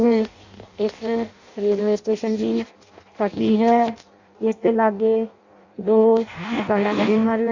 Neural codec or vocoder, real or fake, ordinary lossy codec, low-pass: codec, 16 kHz in and 24 kHz out, 0.6 kbps, FireRedTTS-2 codec; fake; Opus, 64 kbps; 7.2 kHz